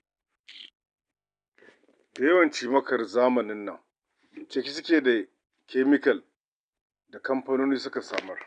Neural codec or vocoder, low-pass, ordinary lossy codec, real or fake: none; 9.9 kHz; none; real